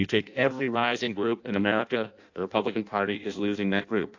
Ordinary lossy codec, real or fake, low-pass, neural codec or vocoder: AAC, 48 kbps; fake; 7.2 kHz; codec, 16 kHz in and 24 kHz out, 0.6 kbps, FireRedTTS-2 codec